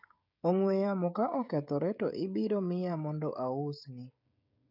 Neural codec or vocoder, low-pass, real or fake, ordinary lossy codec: codec, 16 kHz, 16 kbps, FreqCodec, smaller model; 5.4 kHz; fake; none